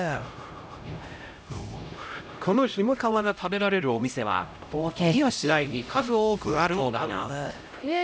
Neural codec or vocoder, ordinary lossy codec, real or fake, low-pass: codec, 16 kHz, 0.5 kbps, X-Codec, HuBERT features, trained on LibriSpeech; none; fake; none